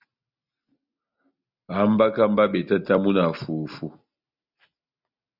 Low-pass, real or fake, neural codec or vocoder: 5.4 kHz; real; none